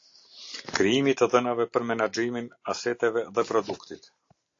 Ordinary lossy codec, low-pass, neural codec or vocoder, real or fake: AAC, 48 kbps; 7.2 kHz; none; real